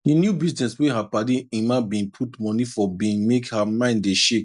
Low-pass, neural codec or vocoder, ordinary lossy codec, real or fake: 10.8 kHz; none; none; real